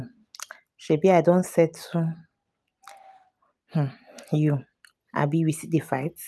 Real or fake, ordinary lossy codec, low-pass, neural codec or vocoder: real; none; none; none